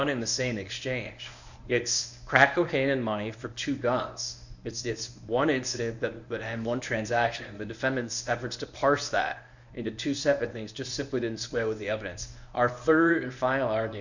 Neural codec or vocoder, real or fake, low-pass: codec, 24 kHz, 0.9 kbps, WavTokenizer, medium speech release version 1; fake; 7.2 kHz